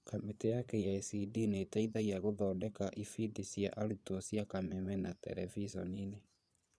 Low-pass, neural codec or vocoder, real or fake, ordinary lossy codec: none; vocoder, 22.05 kHz, 80 mel bands, Vocos; fake; none